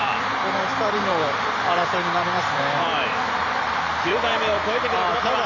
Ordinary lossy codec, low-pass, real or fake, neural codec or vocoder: none; 7.2 kHz; fake; vocoder, 44.1 kHz, 128 mel bands every 512 samples, BigVGAN v2